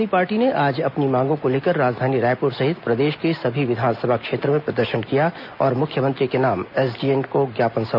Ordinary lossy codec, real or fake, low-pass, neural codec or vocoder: none; real; 5.4 kHz; none